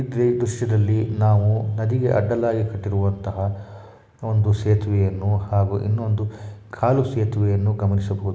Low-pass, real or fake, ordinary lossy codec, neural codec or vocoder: none; real; none; none